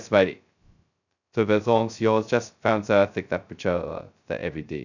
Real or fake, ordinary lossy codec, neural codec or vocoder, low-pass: fake; none; codec, 16 kHz, 0.2 kbps, FocalCodec; 7.2 kHz